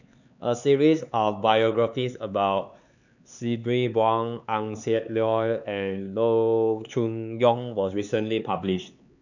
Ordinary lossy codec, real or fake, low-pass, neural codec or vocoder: none; fake; 7.2 kHz; codec, 16 kHz, 4 kbps, X-Codec, HuBERT features, trained on LibriSpeech